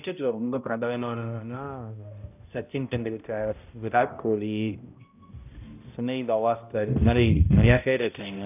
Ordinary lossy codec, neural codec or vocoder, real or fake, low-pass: none; codec, 16 kHz, 0.5 kbps, X-Codec, HuBERT features, trained on balanced general audio; fake; 3.6 kHz